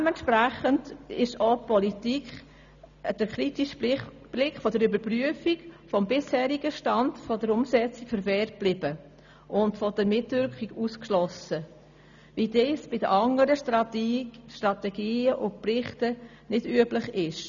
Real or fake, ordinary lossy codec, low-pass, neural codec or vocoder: real; none; 7.2 kHz; none